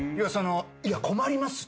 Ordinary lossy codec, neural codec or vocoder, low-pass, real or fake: none; none; none; real